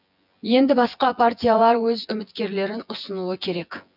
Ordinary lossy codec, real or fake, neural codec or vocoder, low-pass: none; fake; vocoder, 24 kHz, 100 mel bands, Vocos; 5.4 kHz